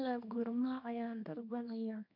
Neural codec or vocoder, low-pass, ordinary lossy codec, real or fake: codec, 24 kHz, 0.9 kbps, WavTokenizer, small release; 5.4 kHz; none; fake